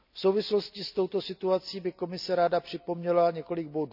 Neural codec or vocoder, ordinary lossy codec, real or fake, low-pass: none; none; real; 5.4 kHz